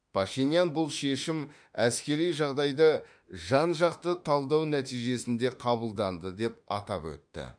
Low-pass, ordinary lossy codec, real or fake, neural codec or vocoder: 9.9 kHz; none; fake; autoencoder, 48 kHz, 32 numbers a frame, DAC-VAE, trained on Japanese speech